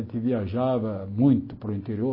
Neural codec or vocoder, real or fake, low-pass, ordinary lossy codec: none; real; 5.4 kHz; MP3, 24 kbps